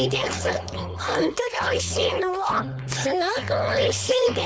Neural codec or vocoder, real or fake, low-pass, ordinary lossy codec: codec, 16 kHz, 4.8 kbps, FACodec; fake; none; none